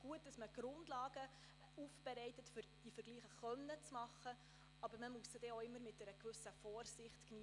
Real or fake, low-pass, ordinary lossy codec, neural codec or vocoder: real; 10.8 kHz; none; none